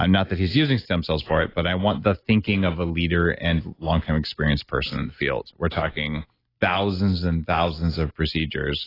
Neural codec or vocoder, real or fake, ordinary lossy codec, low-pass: none; real; AAC, 24 kbps; 5.4 kHz